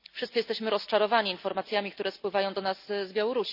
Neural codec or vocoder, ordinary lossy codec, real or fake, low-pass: none; none; real; 5.4 kHz